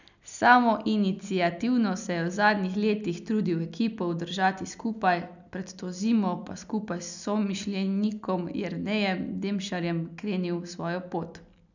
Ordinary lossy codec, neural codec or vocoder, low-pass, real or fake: none; none; 7.2 kHz; real